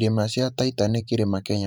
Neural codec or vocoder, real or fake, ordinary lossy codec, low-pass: vocoder, 44.1 kHz, 128 mel bands every 512 samples, BigVGAN v2; fake; none; none